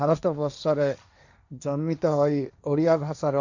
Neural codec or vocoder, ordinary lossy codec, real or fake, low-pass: codec, 16 kHz, 1.1 kbps, Voila-Tokenizer; none; fake; 7.2 kHz